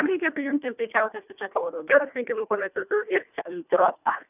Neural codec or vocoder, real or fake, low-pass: codec, 24 kHz, 1.5 kbps, HILCodec; fake; 3.6 kHz